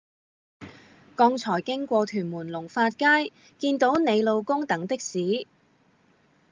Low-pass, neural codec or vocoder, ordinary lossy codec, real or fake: 7.2 kHz; none; Opus, 32 kbps; real